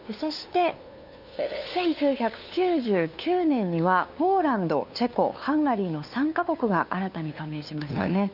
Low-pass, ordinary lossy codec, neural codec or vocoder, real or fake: 5.4 kHz; none; codec, 16 kHz, 2 kbps, FunCodec, trained on LibriTTS, 25 frames a second; fake